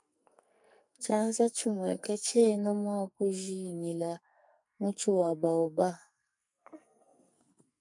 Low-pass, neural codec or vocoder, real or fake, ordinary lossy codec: 10.8 kHz; codec, 44.1 kHz, 2.6 kbps, SNAC; fake; MP3, 96 kbps